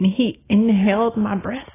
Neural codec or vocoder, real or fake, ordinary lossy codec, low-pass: none; real; AAC, 16 kbps; 3.6 kHz